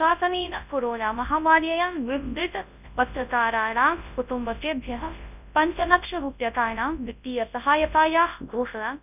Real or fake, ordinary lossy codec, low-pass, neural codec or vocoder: fake; none; 3.6 kHz; codec, 24 kHz, 0.9 kbps, WavTokenizer, large speech release